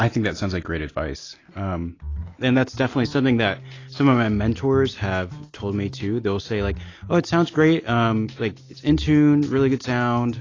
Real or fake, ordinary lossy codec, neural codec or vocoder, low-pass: real; AAC, 32 kbps; none; 7.2 kHz